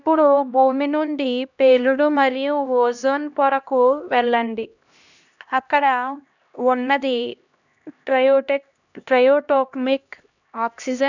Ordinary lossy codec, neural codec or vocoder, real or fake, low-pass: none; codec, 16 kHz, 1 kbps, X-Codec, HuBERT features, trained on LibriSpeech; fake; 7.2 kHz